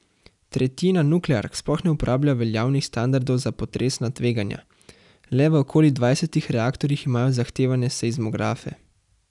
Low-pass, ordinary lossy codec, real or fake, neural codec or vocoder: 10.8 kHz; none; real; none